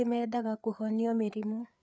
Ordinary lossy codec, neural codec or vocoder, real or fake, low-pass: none; codec, 16 kHz, 4 kbps, FreqCodec, larger model; fake; none